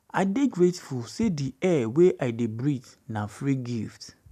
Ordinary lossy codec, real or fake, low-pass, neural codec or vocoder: none; real; 14.4 kHz; none